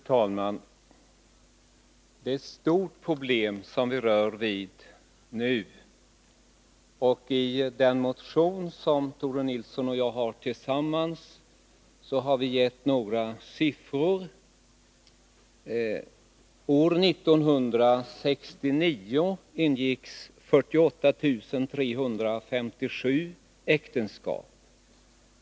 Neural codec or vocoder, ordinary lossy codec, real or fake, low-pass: none; none; real; none